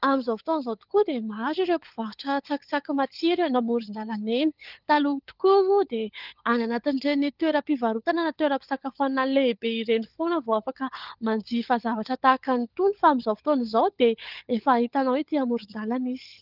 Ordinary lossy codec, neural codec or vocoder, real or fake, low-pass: Opus, 32 kbps; codec, 16 kHz, 16 kbps, FunCodec, trained on LibriTTS, 50 frames a second; fake; 5.4 kHz